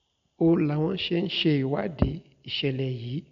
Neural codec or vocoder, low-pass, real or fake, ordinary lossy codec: none; 7.2 kHz; real; MP3, 48 kbps